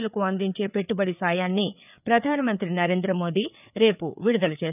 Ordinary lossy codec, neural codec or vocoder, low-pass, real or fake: none; codec, 16 kHz, 4 kbps, FreqCodec, larger model; 3.6 kHz; fake